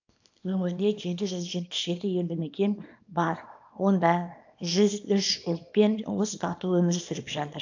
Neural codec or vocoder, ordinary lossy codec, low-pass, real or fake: codec, 24 kHz, 0.9 kbps, WavTokenizer, small release; AAC, 48 kbps; 7.2 kHz; fake